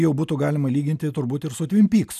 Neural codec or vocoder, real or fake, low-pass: none; real; 14.4 kHz